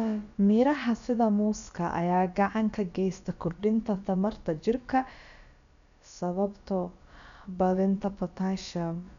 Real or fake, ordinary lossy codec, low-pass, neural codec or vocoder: fake; none; 7.2 kHz; codec, 16 kHz, about 1 kbps, DyCAST, with the encoder's durations